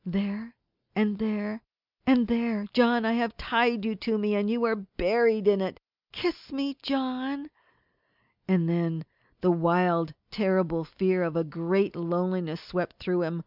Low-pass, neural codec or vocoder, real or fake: 5.4 kHz; none; real